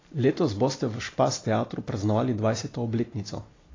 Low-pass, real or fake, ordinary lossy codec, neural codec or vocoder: 7.2 kHz; real; AAC, 32 kbps; none